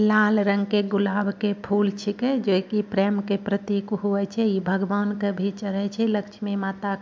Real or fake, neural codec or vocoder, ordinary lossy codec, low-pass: real; none; MP3, 64 kbps; 7.2 kHz